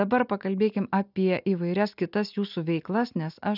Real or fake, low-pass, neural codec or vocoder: real; 5.4 kHz; none